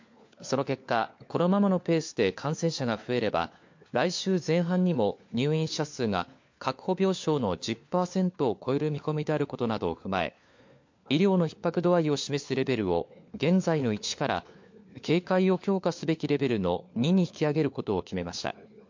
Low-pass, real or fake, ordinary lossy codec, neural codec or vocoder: 7.2 kHz; fake; MP3, 48 kbps; codec, 16 kHz, 4 kbps, FunCodec, trained on LibriTTS, 50 frames a second